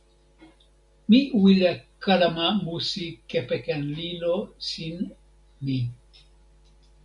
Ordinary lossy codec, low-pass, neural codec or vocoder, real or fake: MP3, 64 kbps; 10.8 kHz; none; real